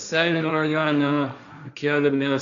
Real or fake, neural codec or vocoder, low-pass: fake; codec, 16 kHz, 1.1 kbps, Voila-Tokenizer; 7.2 kHz